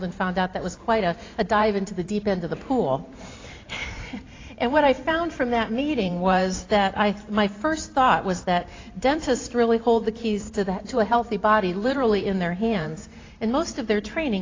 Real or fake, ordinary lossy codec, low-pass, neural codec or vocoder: fake; AAC, 32 kbps; 7.2 kHz; vocoder, 44.1 kHz, 128 mel bands every 512 samples, BigVGAN v2